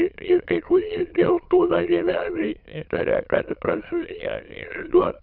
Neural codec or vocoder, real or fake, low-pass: autoencoder, 22.05 kHz, a latent of 192 numbers a frame, VITS, trained on many speakers; fake; 5.4 kHz